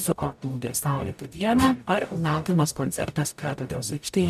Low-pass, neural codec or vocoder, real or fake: 14.4 kHz; codec, 44.1 kHz, 0.9 kbps, DAC; fake